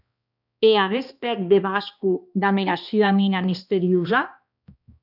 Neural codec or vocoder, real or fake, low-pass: codec, 16 kHz, 1 kbps, X-Codec, HuBERT features, trained on balanced general audio; fake; 5.4 kHz